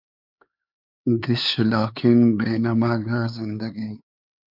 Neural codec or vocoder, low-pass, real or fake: codec, 16 kHz, 4 kbps, X-Codec, HuBERT features, trained on LibriSpeech; 5.4 kHz; fake